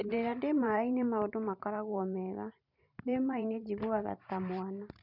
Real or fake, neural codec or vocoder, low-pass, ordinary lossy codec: fake; vocoder, 44.1 kHz, 128 mel bands every 512 samples, BigVGAN v2; 5.4 kHz; none